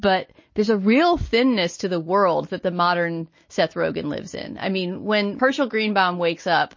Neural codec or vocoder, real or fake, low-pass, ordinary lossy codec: none; real; 7.2 kHz; MP3, 32 kbps